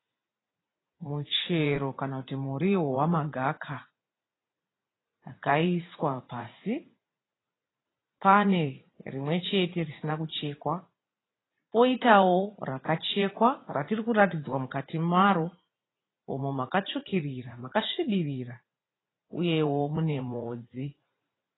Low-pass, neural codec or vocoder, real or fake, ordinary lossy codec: 7.2 kHz; vocoder, 44.1 kHz, 80 mel bands, Vocos; fake; AAC, 16 kbps